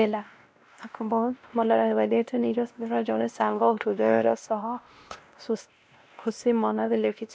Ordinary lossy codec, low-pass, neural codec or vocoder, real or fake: none; none; codec, 16 kHz, 1 kbps, X-Codec, WavLM features, trained on Multilingual LibriSpeech; fake